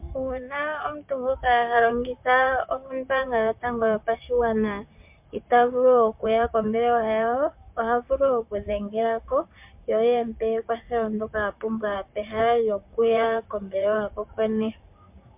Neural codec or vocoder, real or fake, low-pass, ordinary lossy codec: vocoder, 44.1 kHz, 128 mel bands, Pupu-Vocoder; fake; 3.6 kHz; MP3, 32 kbps